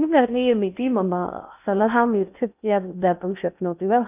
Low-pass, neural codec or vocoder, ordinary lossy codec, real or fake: 3.6 kHz; codec, 16 kHz in and 24 kHz out, 0.6 kbps, FocalCodec, streaming, 2048 codes; none; fake